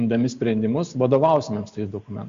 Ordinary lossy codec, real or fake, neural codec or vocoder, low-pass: Opus, 64 kbps; real; none; 7.2 kHz